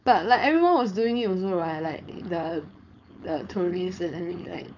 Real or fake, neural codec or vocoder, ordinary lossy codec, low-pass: fake; codec, 16 kHz, 4.8 kbps, FACodec; none; 7.2 kHz